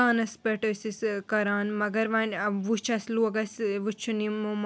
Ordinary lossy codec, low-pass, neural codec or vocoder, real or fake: none; none; none; real